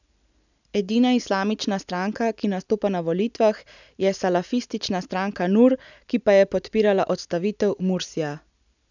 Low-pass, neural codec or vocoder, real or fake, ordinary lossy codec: 7.2 kHz; none; real; none